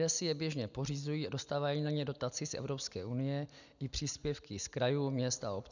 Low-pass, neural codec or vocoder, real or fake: 7.2 kHz; none; real